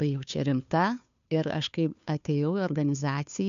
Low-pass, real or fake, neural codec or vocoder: 7.2 kHz; fake; codec, 16 kHz, 2 kbps, FunCodec, trained on Chinese and English, 25 frames a second